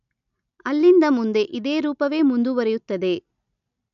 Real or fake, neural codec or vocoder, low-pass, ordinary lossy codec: real; none; 7.2 kHz; none